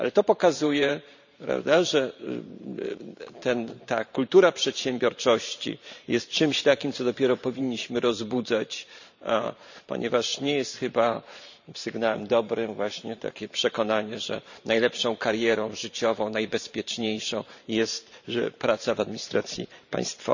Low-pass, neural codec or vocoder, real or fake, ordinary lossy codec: 7.2 kHz; vocoder, 44.1 kHz, 128 mel bands every 512 samples, BigVGAN v2; fake; none